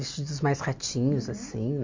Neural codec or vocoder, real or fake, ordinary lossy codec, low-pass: none; real; MP3, 48 kbps; 7.2 kHz